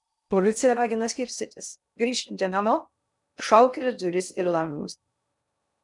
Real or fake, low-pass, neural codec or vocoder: fake; 10.8 kHz; codec, 16 kHz in and 24 kHz out, 0.6 kbps, FocalCodec, streaming, 2048 codes